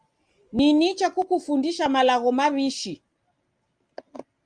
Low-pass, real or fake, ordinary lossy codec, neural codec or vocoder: 9.9 kHz; real; Opus, 32 kbps; none